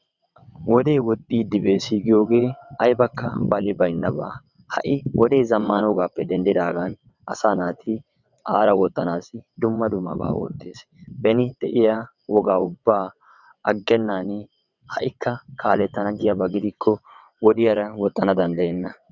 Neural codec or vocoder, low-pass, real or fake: vocoder, 22.05 kHz, 80 mel bands, WaveNeXt; 7.2 kHz; fake